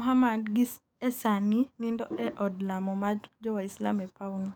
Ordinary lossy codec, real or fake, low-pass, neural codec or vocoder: none; fake; none; codec, 44.1 kHz, 7.8 kbps, DAC